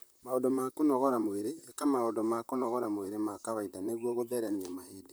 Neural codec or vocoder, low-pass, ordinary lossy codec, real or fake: vocoder, 44.1 kHz, 128 mel bands, Pupu-Vocoder; none; none; fake